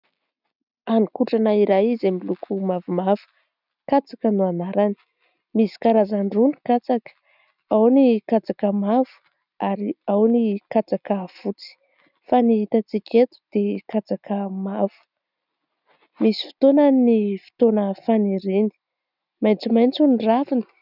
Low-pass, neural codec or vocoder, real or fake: 5.4 kHz; none; real